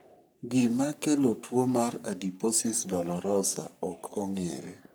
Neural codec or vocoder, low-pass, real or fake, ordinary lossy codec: codec, 44.1 kHz, 3.4 kbps, Pupu-Codec; none; fake; none